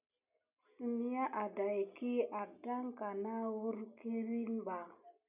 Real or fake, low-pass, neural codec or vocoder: real; 3.6 kHz; none